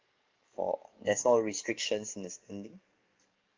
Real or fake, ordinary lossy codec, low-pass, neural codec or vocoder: fake; Opus, 32 kbps; 7.2 kHz; codec, 44.1 kHz, 7.8 kbps, DAC